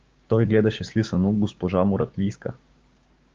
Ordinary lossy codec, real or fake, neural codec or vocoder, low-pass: Opus, 24 kbps; fake; codec, 16 kHz, 6 kbps, DAC; 7.2 kHz